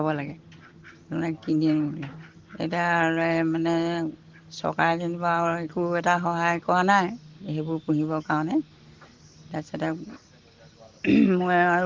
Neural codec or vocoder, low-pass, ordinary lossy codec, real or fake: none; 7.2 kHz; Opus, 16 kbps; real